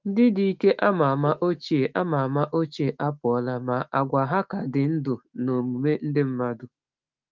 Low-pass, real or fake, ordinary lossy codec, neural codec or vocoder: 7.2 kHz; fake; Opus, 32 kbps; autoencoder, 48 kHz, 128 numbers a frame, DAC-VAE, trained on Japanese speech